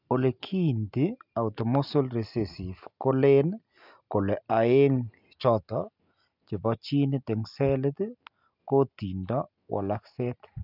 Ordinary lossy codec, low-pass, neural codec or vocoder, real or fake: none; 5.4 kHz; none; real